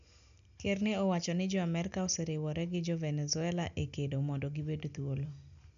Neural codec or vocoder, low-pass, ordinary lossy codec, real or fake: none; 7.2 kHz; none; real